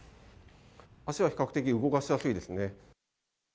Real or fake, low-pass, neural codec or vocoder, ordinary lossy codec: real; none; none; none